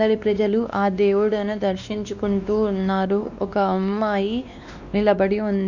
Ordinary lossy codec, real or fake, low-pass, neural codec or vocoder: none; fake; 7.2 kHz; codec, 16 kHz, 2 kbps, X-Codec, WavLM features, trained on Multilingual LibriSpeech